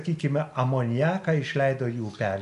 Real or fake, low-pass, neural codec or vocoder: real; 10.8 kHz; none